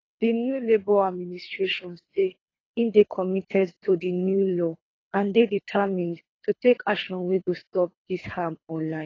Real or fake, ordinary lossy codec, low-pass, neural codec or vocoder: fake; AAC, 32 kbps; 7.2 kHz; codec, 24 kHz, 3 kbps, HILCodec